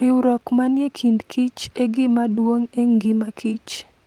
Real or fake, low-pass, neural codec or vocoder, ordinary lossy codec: fake; 19.8 kHz; vocoder, 44.1 kHz, 128 mel bands, Pupu-Vocoder; Opus, 24 kbps